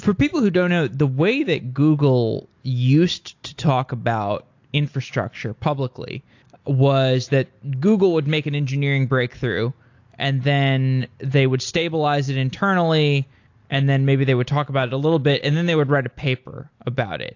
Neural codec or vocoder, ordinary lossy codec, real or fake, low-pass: none; AAC, 48 kbps; real; 7.2 kHz